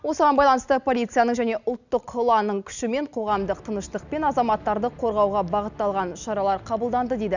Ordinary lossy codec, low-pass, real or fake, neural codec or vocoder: none; 7.2 kHz; real; none